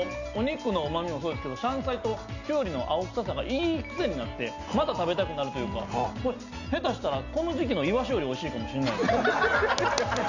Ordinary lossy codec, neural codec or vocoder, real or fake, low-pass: none; none; real; 7.2 kHz